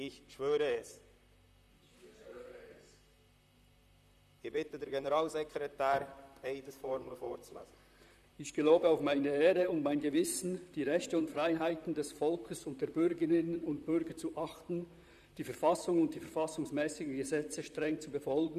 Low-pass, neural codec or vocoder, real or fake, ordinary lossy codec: 14.4 kHz; vocoder, 44.1 kHz, 128 mel bands, Pupu-Vocoder; fake; none